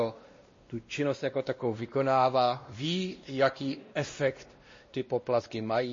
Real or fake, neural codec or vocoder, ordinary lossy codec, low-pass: fake; codec, 16 kHz, 1 kbps, X-Codec, WavLM features, trained on Multilingual LibriSpeech; MP3, 32 kbps; 7.2 kHz